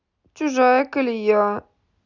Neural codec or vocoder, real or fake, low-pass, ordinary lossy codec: none; real; 7.2 kHz; none